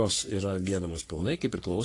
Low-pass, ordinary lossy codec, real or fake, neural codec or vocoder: 10.8 kHz; AAC, 48 kbps; fake; codec, 44.1 kHz, 3.4 kbps, Pupu-Codec